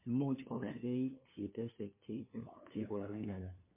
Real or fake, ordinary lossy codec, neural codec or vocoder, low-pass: fake; MP3, 24 kbps; codec, 16 kHz, 2 kbps, FunCodec, trained on LibriTTS, 25 frames a second; 3.6 kHz